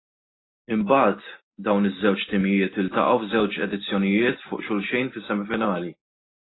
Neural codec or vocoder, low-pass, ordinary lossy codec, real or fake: none; 7.2 kHz; AAC, 16 kbps; real